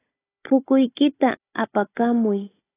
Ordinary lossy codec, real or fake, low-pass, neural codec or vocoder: AAC, 16 kbps; fake; 3.6 kHz; codec, 16 kHz, 16 kbps, FunCodec, trained on Chinese and English, 50 frames a second